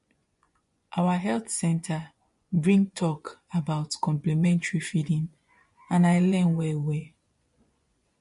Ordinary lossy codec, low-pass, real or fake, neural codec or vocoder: MP3, 48 kbps; 10.8 kHz; fake; vocoder, 24 kHz, 100 mel bands, Vocos